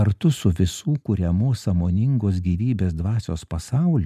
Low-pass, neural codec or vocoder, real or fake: 14.4 kHz; none; real